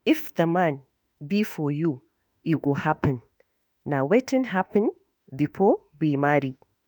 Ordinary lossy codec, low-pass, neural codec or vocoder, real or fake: none; 19.8 kHz; autoencoder, 48 kHz, 32 numbers a frame, DAC-VAE, trained on Japanese speech; fake